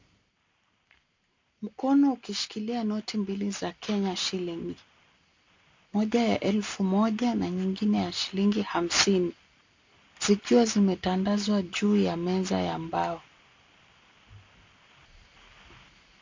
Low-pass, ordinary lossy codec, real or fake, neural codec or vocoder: 7.2 kHz; MP3, 48 kbps; real; none